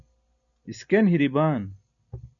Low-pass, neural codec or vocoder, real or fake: 7.2 kHz; none; real